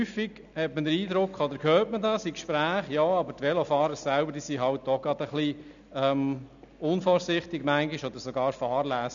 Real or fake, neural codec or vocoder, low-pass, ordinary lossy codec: real; none; 7.2 kHz; none